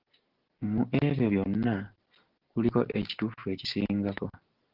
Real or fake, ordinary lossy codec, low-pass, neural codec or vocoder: real; Opus, 16 kbps; 5.4 kHz; none